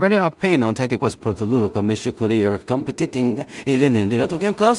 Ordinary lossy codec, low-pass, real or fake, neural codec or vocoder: MP3, 96 kbps; 10.8 kHz; fake; codec, 16 kHz in and 24 kHz out, 0.4 kbps, LongCat-Audio-Codec, two codebook decoder